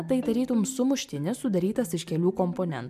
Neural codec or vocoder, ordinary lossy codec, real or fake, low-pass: none; MP3, 96 kbps; real; 14.4 kHz